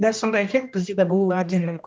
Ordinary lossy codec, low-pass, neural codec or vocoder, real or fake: none; none; codec, 16 kHz, 1 kbps, X-Codec, HuBERT features, trained on general audio; fake